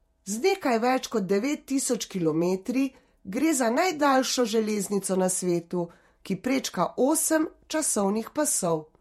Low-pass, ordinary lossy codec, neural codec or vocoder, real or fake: 19.8 kHz; MP3, 64 kbps; vocoder, 48 kHz, 128 mel bands, Vocos; fake